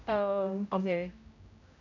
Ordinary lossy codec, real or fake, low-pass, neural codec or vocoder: none; fake; 7.2 kHz; codec, 16 kHz, 0.5 kbps, X-Codec, HuBERT features, trained on general audio